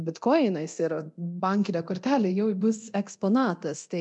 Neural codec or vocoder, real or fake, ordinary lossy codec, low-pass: codec, 24 kHz, 0.9 kbps, DualCodec; fake; MP3, 64 kbps; 10.8 kHz